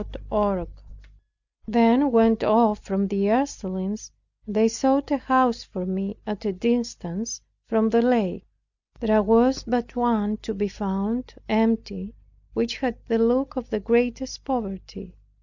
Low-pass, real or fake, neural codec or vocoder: 7.2 kHz; real; none